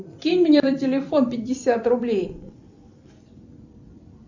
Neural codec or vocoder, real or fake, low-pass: vocoder, 44.1 kHz, 128 mel bands every 256 samples, BigVGAN v2; fake; 7.2 kHz